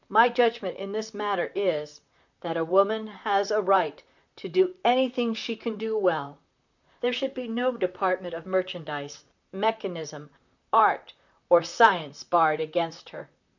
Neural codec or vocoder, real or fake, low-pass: vocoder, 44.1 kHz, 128 mel bands, Pupu-Vocoder; fake; 7.2 kHz